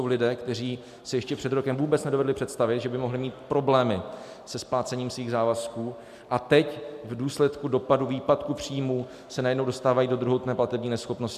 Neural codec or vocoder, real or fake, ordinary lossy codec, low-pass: none; real; MP3, 96 kbps; 14.4 kHz